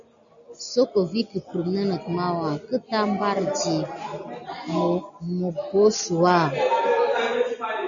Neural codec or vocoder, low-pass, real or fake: none; 7.2 kHz; real